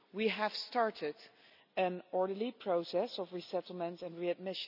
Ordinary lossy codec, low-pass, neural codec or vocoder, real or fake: none; 5.4 kHz; none; real